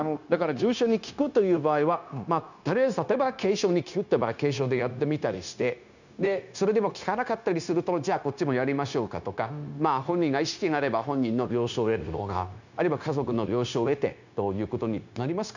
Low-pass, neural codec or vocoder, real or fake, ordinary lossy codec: 7.2 kHz; codec, 16 kHz, 0.9 kbps, LongCat-Audio-Codec; fake; none